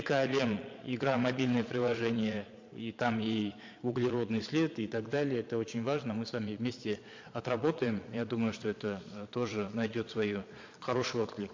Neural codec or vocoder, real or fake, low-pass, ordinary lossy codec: vocoder, 22.05 kHz, 80 mel bands, Vocos; fake; 7.2 kHz; MP3, 48 kbps